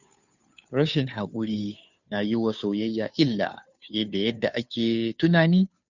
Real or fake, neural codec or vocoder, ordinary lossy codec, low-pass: fake; codec, 16 kHz, 2 kbps, FunCodec, trained on Chinese and English, 25 frames a second; none; 7.2 kHz